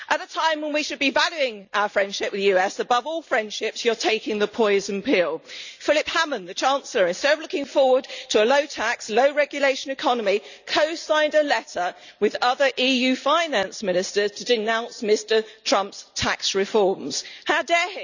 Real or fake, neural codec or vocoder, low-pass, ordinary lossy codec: real; none; 7.2 kHz; none